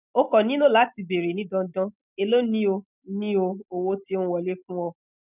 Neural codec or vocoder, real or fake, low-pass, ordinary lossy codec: none; real; 3.6 kHz; none